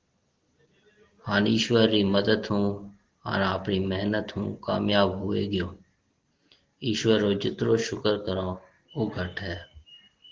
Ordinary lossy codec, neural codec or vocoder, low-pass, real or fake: Opus, 16 kbps; none; 7.2 kHz; real